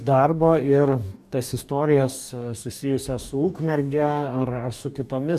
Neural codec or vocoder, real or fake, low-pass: codec, 44.1 kHz, 2.6 kbps, DAC; fake; 14.4 kHz